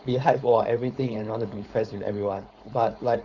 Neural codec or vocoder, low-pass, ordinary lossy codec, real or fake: codec, 16 kHz, 4.8 kbps, FACodec; 7.2 kHz; Opus, 64 kbps; fake